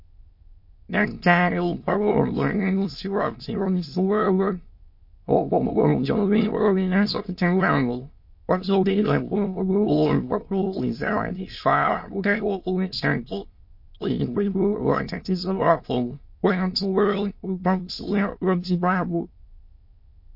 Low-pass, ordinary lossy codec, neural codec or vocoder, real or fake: 5.4 kHz; MP3, 32 kbps; autoencoder, 22.05 kHz, a latent of 192 numbers a frame, VITS, trained on many speakers; fake